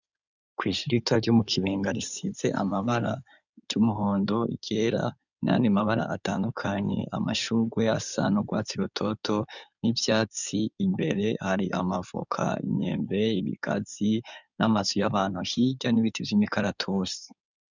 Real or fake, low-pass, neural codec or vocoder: fake; 7.2 kHz; codec, 16 kHz in and 24 kHz out, 2.2 kbps, FireRedTTS-2 codec